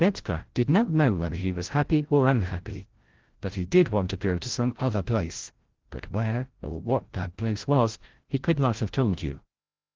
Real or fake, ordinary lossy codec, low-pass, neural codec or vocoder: fake; Opus, 16 kbps; 7.2 kHz; codec, 16 kHz, 0.5 kbps, FreqCodec, larger model